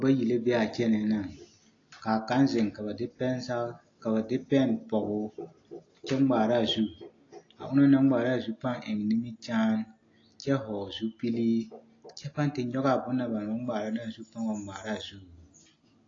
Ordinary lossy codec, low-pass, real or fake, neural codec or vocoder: AAC, 32 kbps; 7.2 kHz; real; none